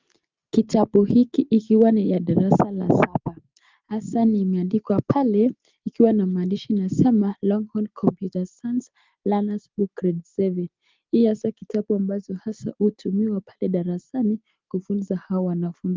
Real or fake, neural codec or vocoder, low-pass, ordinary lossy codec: real; none; 7.2 kHz; Opus, 32 kbps